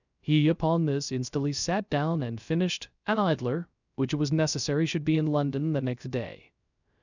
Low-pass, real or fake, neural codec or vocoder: 7.2 kHz; fake; codec, 16 kHz, 0.3 kbps, FocalCodec